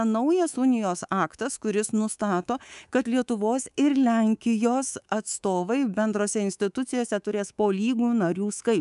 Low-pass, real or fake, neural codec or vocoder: 10.8 kHz; fake; codec, 24 kHz, 3.1 kbps, DualCodec